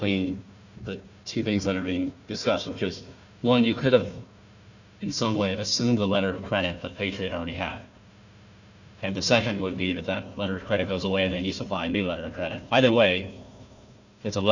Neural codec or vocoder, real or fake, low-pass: codec, 16 kHz, 1 kbps, FunCodec, trained on Chinese and English, 50 frames a second; fake; 7.2 kHz